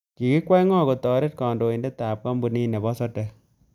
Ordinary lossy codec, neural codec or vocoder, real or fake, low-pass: none; none; real; 19.8 kHz